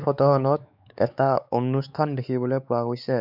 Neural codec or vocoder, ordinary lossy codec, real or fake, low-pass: codec, 16 kHz, 4 kbps, X-Codec, WavLM features, trained on Multilingual LibriSpeech; none; fake; 5.4 kHz